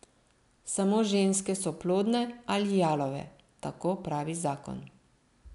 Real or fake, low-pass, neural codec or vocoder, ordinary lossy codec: real; 10.8 kHz; none; none